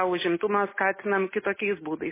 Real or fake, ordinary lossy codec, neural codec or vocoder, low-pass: real; MP3, 16 kbps; none; 3.6 kHz